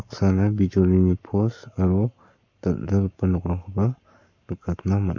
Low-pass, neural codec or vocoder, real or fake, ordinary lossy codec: 7.2 kHz; codec, 44.1 kHz, 7.8 kbps, Pupu-Codec; fake; MP3, 64 kbps